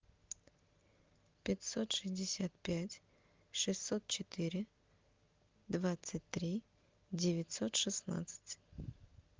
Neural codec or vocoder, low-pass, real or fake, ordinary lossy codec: none; 7.2 kHz; real; Opus, 32 kbps